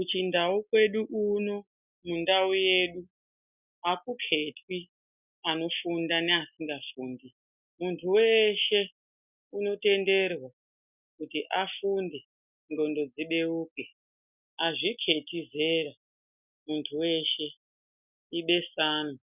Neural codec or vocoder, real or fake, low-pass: none; real; 3.6 kHz